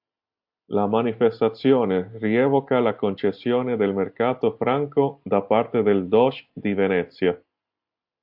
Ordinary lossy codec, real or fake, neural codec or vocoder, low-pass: AAC, 48 kbps; real; none; 5.4 kHz